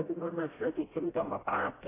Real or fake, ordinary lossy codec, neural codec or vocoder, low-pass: fake; AAC, 16 kbps; codec, 16 kHz, 0.5 kbps, FreqCodec, smaller model; 3.6 kHz